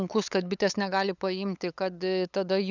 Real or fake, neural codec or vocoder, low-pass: fake; codec, 16 kHz, 8 kbps, FunCodec, trained on LibriTTS, 25 frames a second; 7.2 kHz